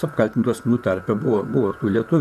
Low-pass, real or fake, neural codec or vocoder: 14.4 kHz; fake; vocoder, 44.1 kHz, 128 mel bands, Pupu-Vocoder